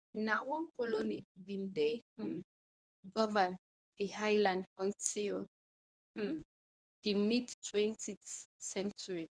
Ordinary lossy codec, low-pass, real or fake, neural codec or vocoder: none; 9.9 kHz; fake; codec, 24 kHz, 0.9 kbps, WavTokenizer, medium speech release version 1